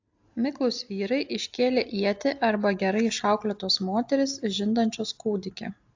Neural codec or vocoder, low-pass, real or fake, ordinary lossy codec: none; 7.2 kHz; real; AAC, 48 kbps